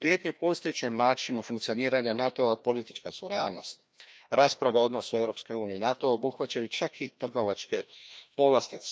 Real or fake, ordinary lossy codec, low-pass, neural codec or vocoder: fake; none; none; codec, 16 kHz, 1 kbps, FreqCodec, larger model